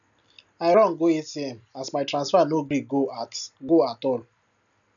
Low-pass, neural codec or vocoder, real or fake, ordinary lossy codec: 7.2 kHz; none; real; none